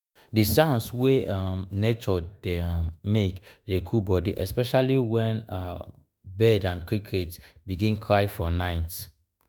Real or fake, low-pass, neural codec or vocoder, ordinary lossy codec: fake; none; autoencoder, 48 kHz, 32 numbers a frame, DAC-VAE, trained on Japanese speech; none